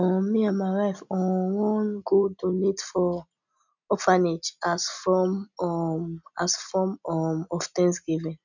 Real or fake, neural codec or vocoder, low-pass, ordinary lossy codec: real; none; 7.2 kHz; none